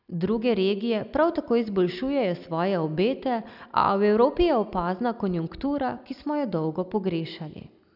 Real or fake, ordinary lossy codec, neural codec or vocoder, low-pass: real; none; none; 5.4 kHz